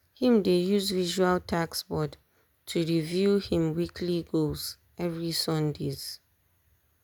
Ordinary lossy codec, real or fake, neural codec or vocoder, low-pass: none; real; none; none